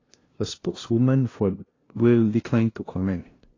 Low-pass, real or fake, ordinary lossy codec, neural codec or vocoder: 7.2 kHz; fake; AAC, 32 kbps; codec, 16 kHz, 0.5 kbps, FunCodec, trained on LibriTTS, 25 frames a second